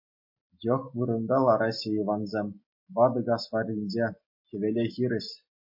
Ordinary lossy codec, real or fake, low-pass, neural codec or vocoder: MP3, 32 kbps; real; 5.4 kHz; none